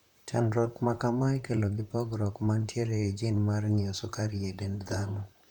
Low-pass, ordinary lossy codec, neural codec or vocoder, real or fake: 19.8 kHz; none; vocoder, 44.1 kHz, 128 mel bands, Pupu-Vocoder; fake